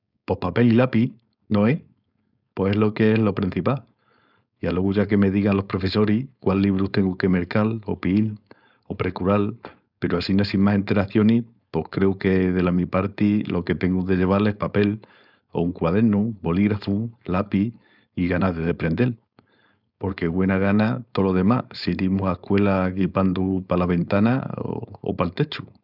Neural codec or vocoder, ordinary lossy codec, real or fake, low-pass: codec, 16 kHz, 4.8 kbps, FACodec; none; fake; 5.4 kHz